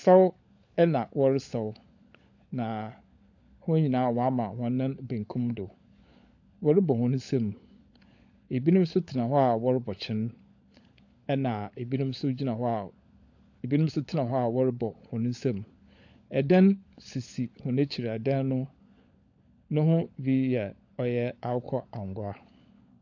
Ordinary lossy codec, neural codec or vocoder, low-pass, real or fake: AAC, 48 kbps; codec, 16 kHz, 16 kbps, FunCodec, trained on LibriTTS, 50 frames a second; 7.2 kHz; fake